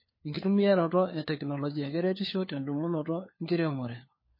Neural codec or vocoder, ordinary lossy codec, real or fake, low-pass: codec, 16 kHz, 4 kbps, FunCodec, trained on LibriTTS, 50 frames a second; MP3, 24 kbps; fake; 5.4 kHz